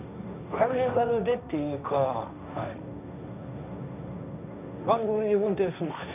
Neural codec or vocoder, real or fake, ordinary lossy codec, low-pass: codec, 16 kHz, 1.1 kbps, Voila-Tokenizer; fake; none; 3.6 kHz